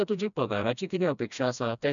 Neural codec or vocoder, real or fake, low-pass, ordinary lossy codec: codec, 16 kHz, 1 kbps, FreqCodec, smaller model; fake; 7.2 kHz; none